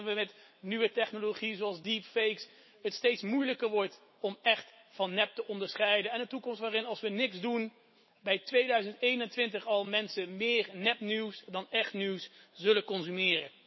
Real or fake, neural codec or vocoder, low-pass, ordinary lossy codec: fake; autoencoder, 48 kHz, 128 numbers a frame, DAC-VAE, trained on Japanese speech; 7.2 kHz; MP3, 24 kbps